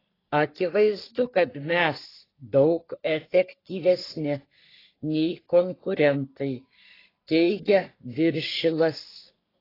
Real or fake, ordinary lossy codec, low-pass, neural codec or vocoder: fake; AAC, 24 kbps; 5.4 kHz; codec, 44.1 kHz, 3.4 kbps, Pupu-Codec